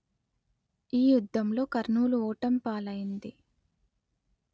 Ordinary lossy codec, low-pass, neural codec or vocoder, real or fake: none; none; none; real